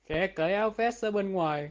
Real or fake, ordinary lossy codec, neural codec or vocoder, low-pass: real; Opus, 16 kbps; none; 7.2 kHz